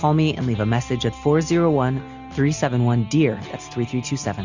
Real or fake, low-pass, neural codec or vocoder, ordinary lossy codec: real; 7.2 kHz; none; Opus, 64 kbps